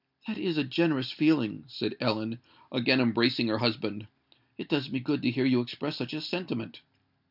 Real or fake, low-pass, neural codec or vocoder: real; 5.4 kHz; none